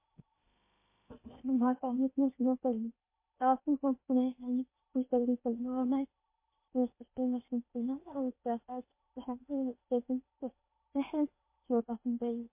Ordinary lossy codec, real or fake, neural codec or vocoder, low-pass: MP3, 24 kbps; fake; codec, 16 kHz in and 24 kHz out, 0.8 kbps, FocalCodec, streaming, 65536 codes; 3.6 kHz